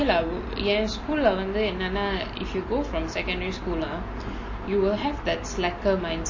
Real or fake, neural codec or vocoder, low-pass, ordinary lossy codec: real; none; 7.2 kHz; MP3, 32 kbps